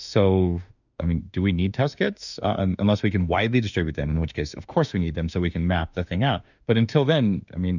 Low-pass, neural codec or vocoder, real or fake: 7.2 kHz; autoencoder, 48 kHz, 32 numbers a frame, DAC-VAE, trained on Japanese speech; fake